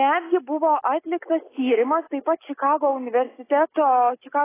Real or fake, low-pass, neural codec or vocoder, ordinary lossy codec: real; 3.6 kHz; none; AAC, 16 kbps